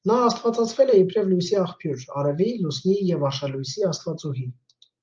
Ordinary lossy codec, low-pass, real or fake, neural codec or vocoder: Opus, 24 kbps; 7.2 kHz; real; none